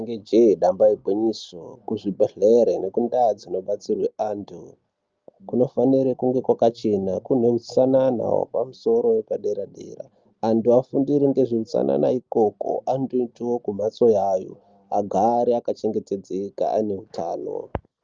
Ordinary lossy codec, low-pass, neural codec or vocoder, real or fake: Opus, 24 kbps; 7.2 kHz; none; real